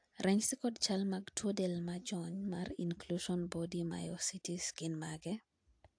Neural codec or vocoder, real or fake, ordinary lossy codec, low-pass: none; real; AAC, 64 kbps; 9.9 kHz